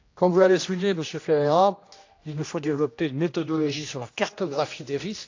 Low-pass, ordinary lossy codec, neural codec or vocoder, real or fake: 7.2 kHz; AAC, 48 kbps; codec, 16 kHz, 1 kbps, X-Codec, HuBERT features, trained on general audio; fake